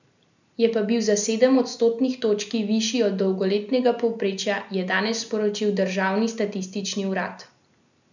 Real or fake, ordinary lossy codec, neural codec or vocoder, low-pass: real; none; none; 7.2 kHz